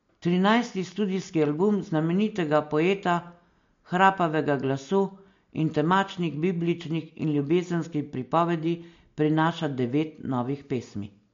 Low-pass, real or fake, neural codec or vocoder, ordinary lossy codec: 7.2 kHz; real; none; MP3, 48 kbps